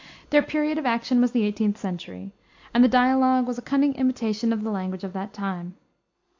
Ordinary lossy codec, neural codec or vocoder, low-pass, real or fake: AAC, 48 kbps; none; 7.2 kHz; real